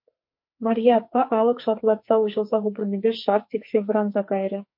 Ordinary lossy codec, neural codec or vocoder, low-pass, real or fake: MP3, 32 kbps; codec, 44.1 kHz, 2.6 kbps, SNAC; 5.4 kHz; fake